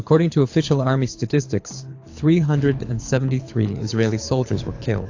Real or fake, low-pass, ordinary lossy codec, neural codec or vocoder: fake; 7.2 kHz; AAC, 48 kbps; codec, 24 kHz, 6 kbps, HILCodec